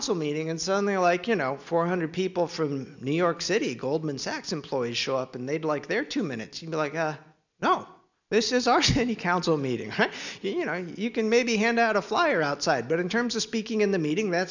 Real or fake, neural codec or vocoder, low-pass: real; none; 7.2 kHz